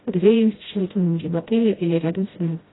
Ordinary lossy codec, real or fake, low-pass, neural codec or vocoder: AAC, 16 kbps; fake; 7.2 kHz; codec, 16 kHz, 0.5 kbps, FreqCodec, smaller model